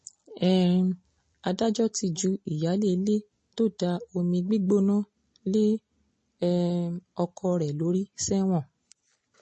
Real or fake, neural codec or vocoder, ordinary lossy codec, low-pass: real; none; MP3, 32 kbps; 10.8 kHz